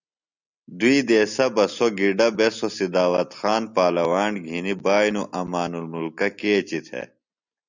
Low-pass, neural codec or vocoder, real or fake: 7.2 kHz; none; real